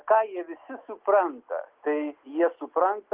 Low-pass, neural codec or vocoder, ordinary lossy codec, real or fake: 3.6 kHz; none; Opus, 32 kbps; real